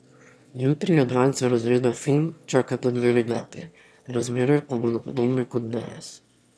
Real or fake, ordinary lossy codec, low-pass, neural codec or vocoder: fake; none; none; autoencoder, 22.05 kHz, a latent of 192 numbers a frame, VITS, trained on one speaker